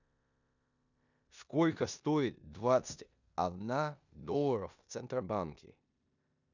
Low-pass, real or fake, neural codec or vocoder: 7.2 kHz; fake; codec, 16 kHz in and 24 kHz out, 0.9 kbps, LongCat-Audio-Codec, four codebook decoder